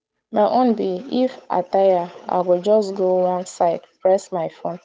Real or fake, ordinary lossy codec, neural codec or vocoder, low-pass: fake; none; codec, 16 kHz, 8 kbps, FunCodec, trained on Chinese and English, 25 frames a second; none